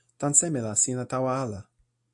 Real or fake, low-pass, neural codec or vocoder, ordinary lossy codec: real; 10.8 kHz; none; AAC, 64 kbps